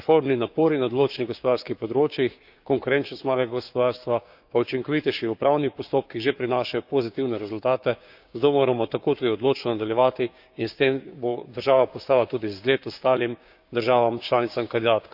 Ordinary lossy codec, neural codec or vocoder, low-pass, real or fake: none; codec, 16 kHz, 6 kbps, DAC; 5.4 kHz; fake